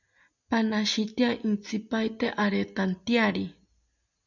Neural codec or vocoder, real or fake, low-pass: none; real; 7.2 kHz